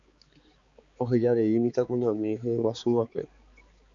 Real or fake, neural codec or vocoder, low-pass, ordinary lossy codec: fake; codec, 16 kHz, 4 kbps, X-Codec, HuBERT features, trained on balanced general audio; 7.2 kHz; Opus, 64 kbps